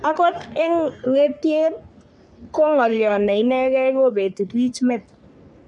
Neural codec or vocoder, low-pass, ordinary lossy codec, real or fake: codec, 44.1 kHz, 3.4 kbps, Pupu-Codec; 10.8 kHz; none; fake